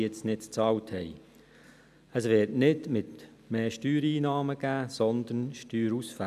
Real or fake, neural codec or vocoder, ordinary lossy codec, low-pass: real; none; none; 14.4 kHz